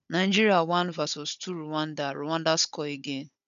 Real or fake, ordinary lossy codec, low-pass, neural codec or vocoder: fake; none; 7.2 kHz; codec, 16 kHz, 16 kbps, FunCodec, trained on Chinese and English, 50 frames a second